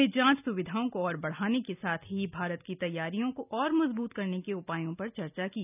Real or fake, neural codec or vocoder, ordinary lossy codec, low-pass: real; none; none; 3.6 kHz